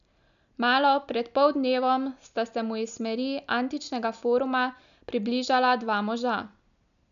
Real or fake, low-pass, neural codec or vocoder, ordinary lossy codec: real; 7.2 kHz; none; none